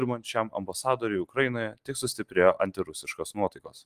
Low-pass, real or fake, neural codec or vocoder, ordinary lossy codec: 14.4 kHz; real; none; Opus, 24 kbps